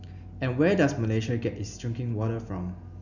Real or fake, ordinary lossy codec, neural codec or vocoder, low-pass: real; none; none; 7.2 kHz